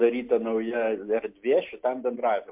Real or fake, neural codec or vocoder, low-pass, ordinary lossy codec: real; none; 3.6 kHz; MP3, 32 kbps